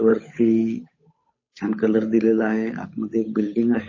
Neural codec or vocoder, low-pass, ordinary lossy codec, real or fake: codec, 16 kHz, 8 kbps, FunCodec, trained on Chinese and English, 25 frames a second; 7.2 kHz; MP3, 32 kbps; fake